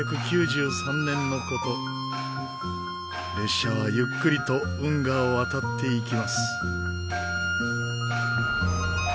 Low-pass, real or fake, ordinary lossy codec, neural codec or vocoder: none; real; none; none